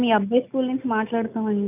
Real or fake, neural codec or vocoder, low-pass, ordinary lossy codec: real; none; 3.6 kHz; none